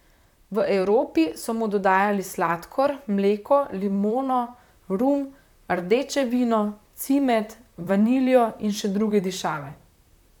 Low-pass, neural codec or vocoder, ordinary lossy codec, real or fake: 19.8 kHz; vocoder, 44.1 kHz, 128 mel bands, Pupu-Vocoder; none; fake